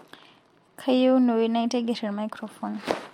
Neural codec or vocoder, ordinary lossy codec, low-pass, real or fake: none; MP3, 64 kbps; 19.8 kHz; real